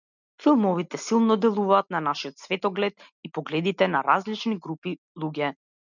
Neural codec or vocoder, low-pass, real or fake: none; 7.2 kHz; real